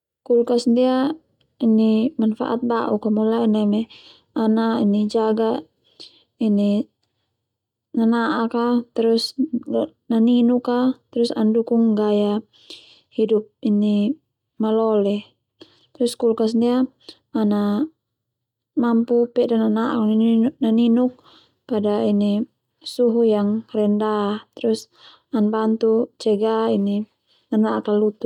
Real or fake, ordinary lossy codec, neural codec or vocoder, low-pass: real; none; none; 19.8 kHz